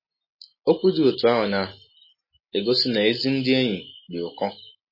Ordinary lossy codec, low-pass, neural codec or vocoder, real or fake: MP3, 24 kbps; 5.4 kHz; none; real